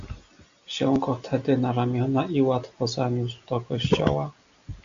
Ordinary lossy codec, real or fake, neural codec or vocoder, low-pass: AAC, 64 kbps; real; none; 7.2 kHz